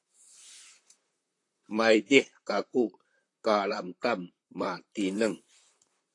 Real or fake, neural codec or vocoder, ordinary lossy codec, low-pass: fake; vocoder, 44.1 kHz, 128 mel bands, Pupu-Vocoder; AAC, 48 kbps; 10.8 kHz